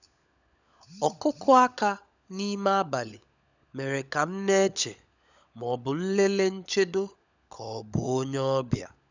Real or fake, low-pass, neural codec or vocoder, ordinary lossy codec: fake; 7.2 kHz; codec, 16 kHz, 16 kbps, FunCodec, trained on LibriTTS, 50 frames a second; none